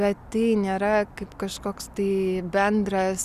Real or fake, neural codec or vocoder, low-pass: real; none; 14.4 kHz